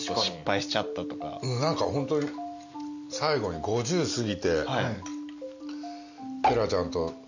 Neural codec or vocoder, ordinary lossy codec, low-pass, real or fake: none; none; 7.2 kHz; real